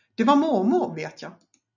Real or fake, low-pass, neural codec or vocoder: real; 7.2 kHz; none